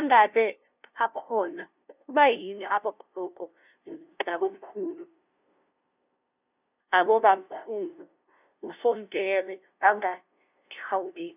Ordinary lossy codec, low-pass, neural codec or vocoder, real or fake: none; 3.6 kHz; codec, 16 kHz, 0.5 kbps, FunCodec, trained on LibriTTS, 25 frames a second; fake